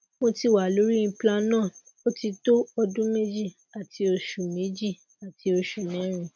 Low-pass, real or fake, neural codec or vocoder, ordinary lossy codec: 7.2 kHz; real; none; none